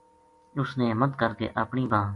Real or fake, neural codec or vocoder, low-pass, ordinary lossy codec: real; none; 10.8 kHz; AAC, 48 kbps